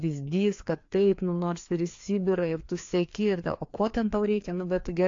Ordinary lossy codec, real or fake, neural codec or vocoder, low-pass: AAC, 48 kbps; fake; codec, 16 kHz, 2 kbps, FreqCodec, larger model; 7.2 kHz